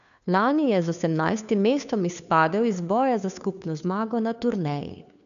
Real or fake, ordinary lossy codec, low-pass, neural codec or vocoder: fake; none; 7.2 kHz; codec, 16 kHz, 2 kbps, FunCodec, trained on Chinese and English, 25 frames a second